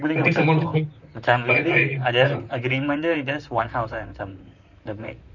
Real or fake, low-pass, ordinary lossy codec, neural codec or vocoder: fake; 7.2 kHz; none; vocoder, 44.1 kHz, 128 mel bands, Pupu-Vocoder